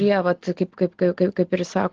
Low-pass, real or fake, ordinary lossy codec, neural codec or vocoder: 7.2 kHz; real; Opus, 16 kbps; none